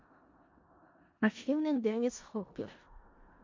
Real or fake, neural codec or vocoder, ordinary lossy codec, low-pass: fake; codec, 16 kHz in and 24 kHz out, 0.4 kbps, LongCat-Audio-Codec, four codebook decoder; MP3, 48 kbps; 7.2 kHz